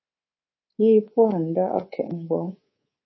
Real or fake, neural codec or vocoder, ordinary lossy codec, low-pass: fake; codec, 24 kHz, 3.1 kbps, DualCodec; MP3, 24 kbps; 7.2 kHz